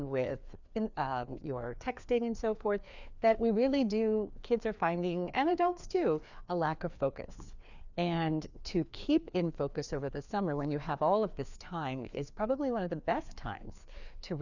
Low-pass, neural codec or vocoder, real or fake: 7.2 kHz; codec, 16 kHz, 2 kbps, FreqCodec, larger model; fake